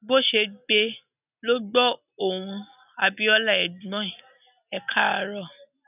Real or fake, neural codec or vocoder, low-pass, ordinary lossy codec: real; none; 3.6 kHz; none